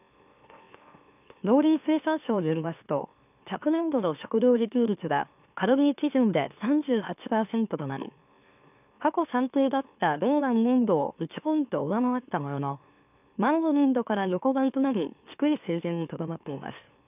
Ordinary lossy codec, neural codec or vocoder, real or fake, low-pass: none; autoencoder, 44.1 kHz, a latent of 192 numbers a frame, MeloTTS; fake; 3.6 kHz